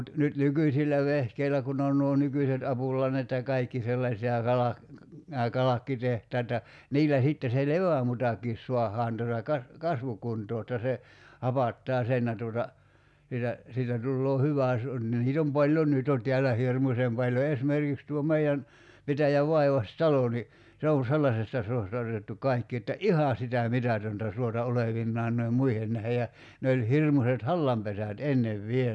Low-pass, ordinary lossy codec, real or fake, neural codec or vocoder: none; none; real; none